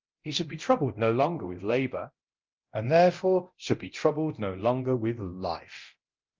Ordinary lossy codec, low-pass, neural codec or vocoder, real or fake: Opus, 24 kbps; 7.2 kHz; codec, 24 kHz, 0.9 kbps, DualCodec; fake